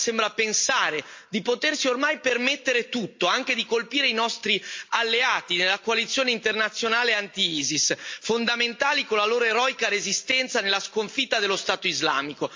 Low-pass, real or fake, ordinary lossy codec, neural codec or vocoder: 7.2 kHz; real; MP3, 48 kbps; none